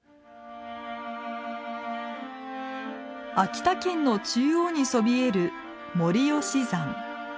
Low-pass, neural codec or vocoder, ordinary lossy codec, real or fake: none; none; none; real